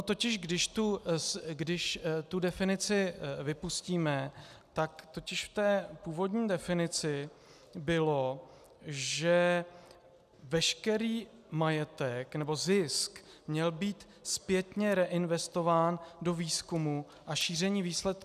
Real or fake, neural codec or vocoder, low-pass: real; none; 14.4 kHz